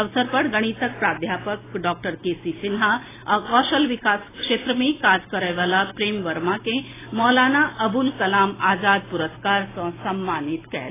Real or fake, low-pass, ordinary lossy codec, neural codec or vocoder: real; 3.6 kHz; AAC, 16 kbps; none